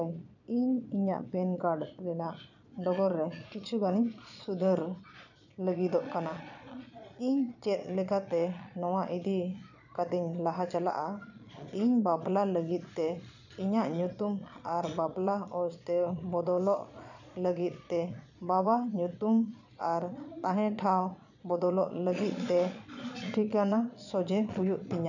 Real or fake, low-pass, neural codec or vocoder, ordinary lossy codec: fake; 7.2 kHz; vocoder, 22.05 kHz, 80 mel bands, Vocos; none